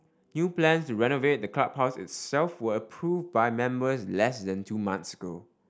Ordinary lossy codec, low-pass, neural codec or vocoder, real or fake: none; none; none; real